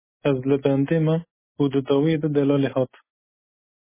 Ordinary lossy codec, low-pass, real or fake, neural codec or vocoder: MP3, 16 kbps; 3.6 kHz; real; none